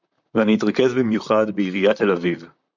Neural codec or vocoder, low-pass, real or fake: vocoder, 44.1 kHz, 128 mel bands, Pupu-Vocoder; 7.2 kHz; fake